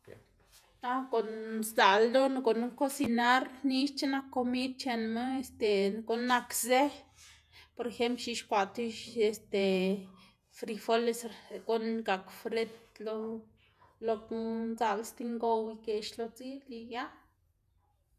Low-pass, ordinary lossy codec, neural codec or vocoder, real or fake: 14.4 kHz; none; vocoder, 48 kHz, 128 mel bands, Vocos; fake